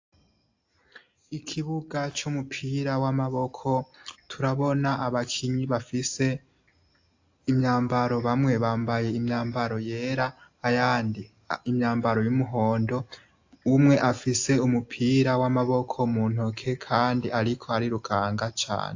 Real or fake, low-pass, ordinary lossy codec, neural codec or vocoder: real; 7.2 kHz; AAC, 48 kbps; none